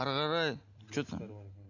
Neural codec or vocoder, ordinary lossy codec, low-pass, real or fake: none; none; 7.2 kHz; real